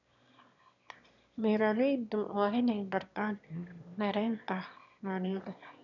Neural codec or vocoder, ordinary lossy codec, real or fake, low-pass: autoencoder, 22.05 kHz, a latent of 192 numbers a frame, VITS, trained on one speaker; none; fake; 7.2 kHz